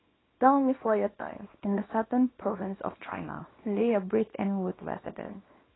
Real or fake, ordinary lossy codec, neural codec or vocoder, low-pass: fake; AAC, 16 kbps; codec, 24 kHz, 0.9 kbps, WavTokenizer, small release; 7.2 kHz